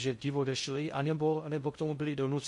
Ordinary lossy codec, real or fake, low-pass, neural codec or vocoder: MP3, 48 kbps; fake; 10.8 kHz; codec, 16 kHz in and 24 kHz out, 0.6 kbps, FocalCodec, streaming, 2048 codes